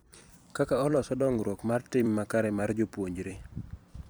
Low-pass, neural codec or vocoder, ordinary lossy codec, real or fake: none; vocoder, 44.1 kHz, 128 mel bands every 256 samples, BigVGAN v2; none; fake